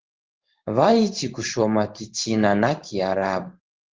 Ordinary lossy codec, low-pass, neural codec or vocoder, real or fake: Opus, 16 kbps; 7.2 kHz; codec, 16 kHz in and 24 kHz out, 1 kbps, XY-Tokenizer; fake